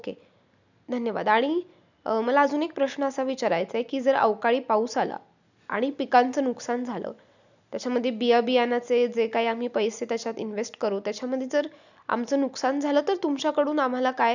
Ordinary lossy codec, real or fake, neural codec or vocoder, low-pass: none; real; none; 7.2 kHz